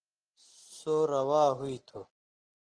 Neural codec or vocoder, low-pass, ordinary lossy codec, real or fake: none; 9.9 kHz; Opus, 16 kbps; real